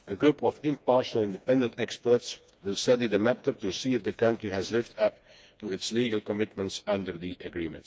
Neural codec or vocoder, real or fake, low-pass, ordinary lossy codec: codec, 16 kHz, 2 kbps, FreqCodec, smaller model; fake; none; none